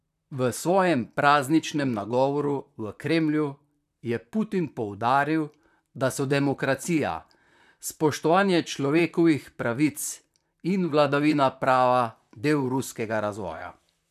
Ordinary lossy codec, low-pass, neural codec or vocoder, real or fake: none; 14.4 kHz; vocoder, 44.1 kHz, 128 mel bands, Pupu-Vocoder; fake